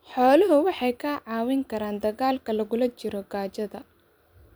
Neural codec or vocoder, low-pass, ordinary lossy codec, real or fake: vocoder, 44.1 kHz, 128 mel bands every 256 samples, BigVGAN v2; none; none; fake